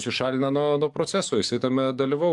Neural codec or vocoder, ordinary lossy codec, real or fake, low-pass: codec, 44.1 kHz, 7.8 kbps, DAC; AAC, 64 kbps; fake; 10.8 kHz